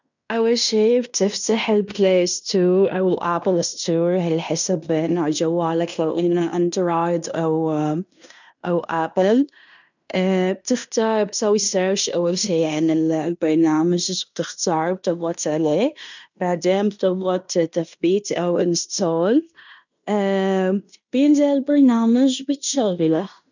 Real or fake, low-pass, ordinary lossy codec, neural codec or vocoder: fake; 7.2 kHz; none; codec, 16 kHz in and 24 kHz out, 0.9 kbps, LongCat-Audio-Codec, fine tuned four codebook decoder